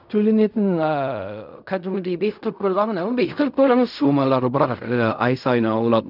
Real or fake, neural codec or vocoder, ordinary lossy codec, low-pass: fake; codec, 16 kHz in and 24 kHz out, 0.4 kbps, LongCat-Audio-Codec, fine tuned four codebook decoder; none; 5.4 kHz